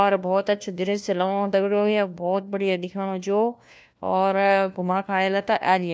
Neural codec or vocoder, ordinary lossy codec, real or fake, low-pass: codec, 16 kHz, 1 kbps, FunCodec, trained on LibriTTS, 50 frames a second; none; fake; none